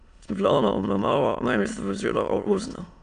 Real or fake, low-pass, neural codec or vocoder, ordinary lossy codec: fake; 9.9 kHz; autoencoder, 22.05 kHz, a latent of 192 numbers a frame, VITS, trained on many speakers; none